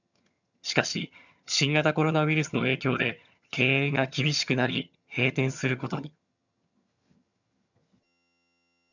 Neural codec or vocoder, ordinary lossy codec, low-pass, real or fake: vocoder, 22.05 kHz, 80 mel bands, HiFi-GAN; none; 7.2 kHz; fake